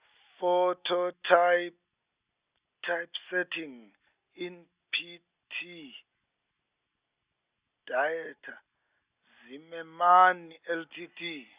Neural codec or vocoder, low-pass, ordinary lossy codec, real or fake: none; 3.6 kHz; Opus, 64 kbps; real